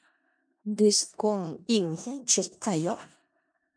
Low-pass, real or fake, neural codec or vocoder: 9.9 kHz; fake; codec, 16 kHz in and 24 kHz out, 0.4 kbps, LongCat-Audio-Codec, four codebook decoder